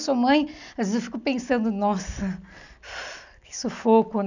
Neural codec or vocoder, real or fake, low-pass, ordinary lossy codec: none; real; 7.2 kHz; none